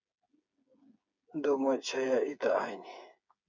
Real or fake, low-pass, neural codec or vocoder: fake; 7.2 kHz; codec, 16 kHz, 8 kbps, FreqCodec, smaller model